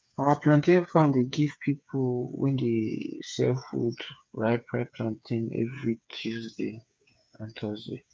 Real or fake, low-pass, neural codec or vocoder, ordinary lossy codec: fake; none; codec, 16 kHz, 8 kbps, FreqCodec, smaller model; none